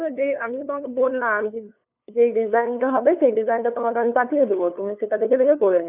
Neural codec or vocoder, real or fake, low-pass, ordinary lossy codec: codec, 16 kHz, 2 kbps, FunCodec, trained on LibriTTS, 25 frames a second; fake; 3.6 kHz; none